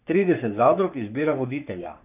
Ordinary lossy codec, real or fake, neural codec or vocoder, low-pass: none; fake; codec, 44.1 kHz, 3.4 kbps, Pupu-Codec; 3.6 kHz